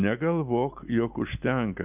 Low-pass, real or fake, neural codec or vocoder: 3.6 kHz; real; none